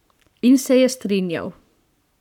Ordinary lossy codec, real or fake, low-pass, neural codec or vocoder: none; fake; 19.8 kHz; codec, 44.1 kHz, 7.8 kbps, Pupu-Codec